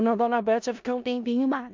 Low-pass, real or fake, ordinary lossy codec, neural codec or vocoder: 7.2 kHz; fake; MP3, 64 kbps; codec, 16 kHz in and 24 kHz out, 0.4 kbps, LongCat-Audio-Codec, four codebook decoder